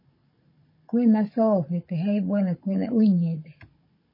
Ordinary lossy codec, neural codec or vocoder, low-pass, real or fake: MP3, 24 kbps; codec, 16 kHz, 16 kbps, FunCodec, trained on Chinese and English, 50 frames a second; 5.4 kHz; fake